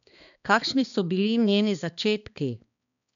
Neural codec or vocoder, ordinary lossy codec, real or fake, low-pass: codec, 16 kHz, 2 kbps, X-Codec, HuBERT features, trained on balanced general audio; none; fake; 7.2 kHz